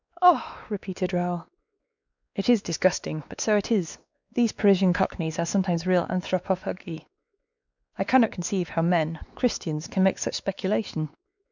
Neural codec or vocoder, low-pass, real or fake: codec, 16 kHz, 2 kbps, X-Codec, WavLM features, trained on Multilingual LibriSpeech; 7.2 kHz; fake